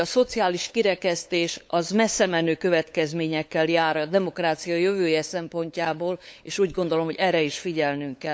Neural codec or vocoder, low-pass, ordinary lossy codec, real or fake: codec, 16 kHz, 8 kbps, FunCodec, trained on LibriTTS, 25 frames a second; none; none; fake